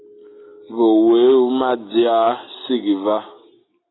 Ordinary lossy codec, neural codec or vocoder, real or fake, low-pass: AAC, 16 kbps; none; real; 7.2 kHz